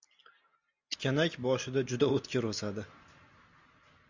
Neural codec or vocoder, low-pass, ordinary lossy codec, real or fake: none; 7.2 kHz; MP3, 64 kbps; real